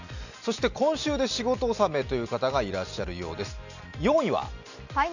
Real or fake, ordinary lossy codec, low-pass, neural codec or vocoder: real; none; 7.2 kHz; none